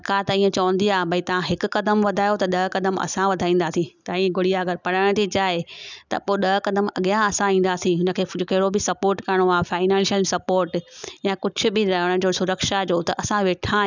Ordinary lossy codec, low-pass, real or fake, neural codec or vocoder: none; 7.2 kHz; real; none